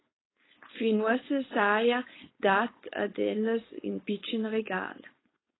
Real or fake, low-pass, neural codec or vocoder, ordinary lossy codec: fake; 7.2 kHz; codec, 16 kHz, 4.8 kbps, FACodec; AAC, 16 kbps